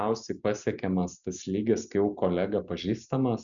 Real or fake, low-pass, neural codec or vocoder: real; 7.2 kHz; none